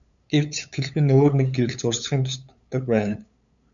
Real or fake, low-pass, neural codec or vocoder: fake; 7.2 kHz; codec, 16 kHz, 8 kbps, FunCodec, trained on LibriTTS, 25 frames a second